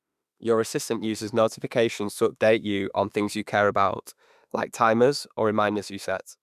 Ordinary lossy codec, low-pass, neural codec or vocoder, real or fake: none; 14.4 kHz; autoencoder, 48 kHz, 32 numbers a frame, DAC-VAE, trained on Japanese speech; fake